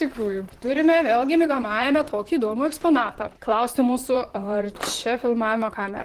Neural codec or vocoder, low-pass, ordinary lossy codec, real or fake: vocoder, 44.1 kHz, 128 mel bands, Pupu-Vocoder; 14.4 kHz; Opus, 16 kbps; fake